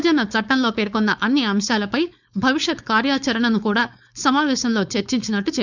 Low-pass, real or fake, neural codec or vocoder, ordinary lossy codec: 7.2 kHz; fake; codec, 16 kHz, 4.8 kbps, FACodec; none